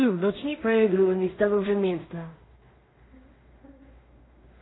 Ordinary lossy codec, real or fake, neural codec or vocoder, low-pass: AAC, 16 kbps; fake; codec, 16 kHz in and 24 kHz out, 0.4 kbps, LongCat-Audio-Codec, two codebook decoder; 7.2 kHz